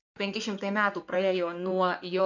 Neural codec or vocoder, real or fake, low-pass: codec, 16 kHz in and 24 kHz out, 2.2 kbps, FireRedTTS-2 codec; fake; 7.2 kHz